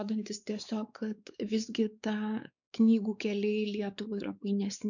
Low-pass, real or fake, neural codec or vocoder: 7.2 kHz; fake; codec, 16 kHz, 4 kbps, X-Codec, WavLM features, trained on Multilingual LibriSpeech